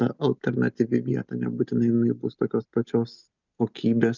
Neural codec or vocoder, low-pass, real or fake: none; 7.2 kHz; real